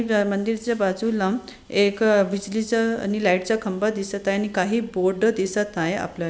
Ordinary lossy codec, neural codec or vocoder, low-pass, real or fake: none; none; none; real